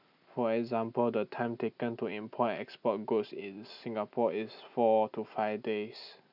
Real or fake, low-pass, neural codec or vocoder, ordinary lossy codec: real; 5.4 kHz; none; none